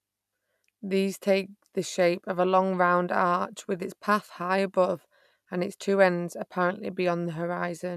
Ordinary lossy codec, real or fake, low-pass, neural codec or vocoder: none; real; 14.4 kHz; none